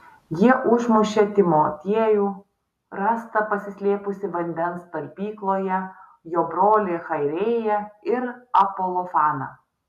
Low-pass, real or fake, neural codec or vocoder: 14.4 kHz; real; none